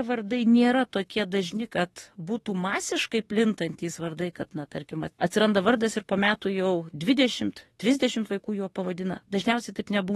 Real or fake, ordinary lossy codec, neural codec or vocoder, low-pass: fake; AAC, 32 kbps; autoencoder, 48 kHz, 32 numbers a frame, DAC-VAE, trained on Japanese speech; 19.8 kHz